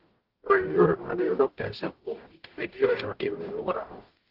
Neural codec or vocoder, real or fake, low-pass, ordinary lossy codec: codec, 44.1 kHz, 0.9 kbps, DAC; fake; 5.4 kHz; Opus, 16 kbps